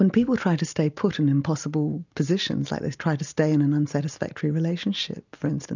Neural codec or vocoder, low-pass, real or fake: none; 7.2 kHz; real